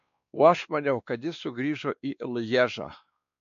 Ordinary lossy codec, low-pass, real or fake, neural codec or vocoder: MP3, 48 kbps; 7.2 kHz; fake; codec, 16 kHz, 2 kbps, X-Codec, WavLM features, trained on Multilingual LibriSpeech